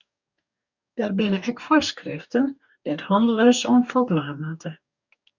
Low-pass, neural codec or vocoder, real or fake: 7.2 kHz; codec, 44.1 kHz, 2.6 kbps, DAC; fake